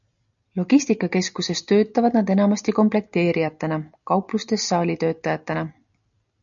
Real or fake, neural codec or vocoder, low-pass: real; none; 7.2 kHz